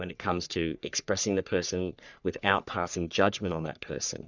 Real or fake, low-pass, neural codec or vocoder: fake; 7.2 kHz; codec, 44.1 kHz, 3.4 kbps, Pupu-Codec